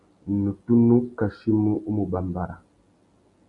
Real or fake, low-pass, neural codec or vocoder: real; 10.8 kHz; none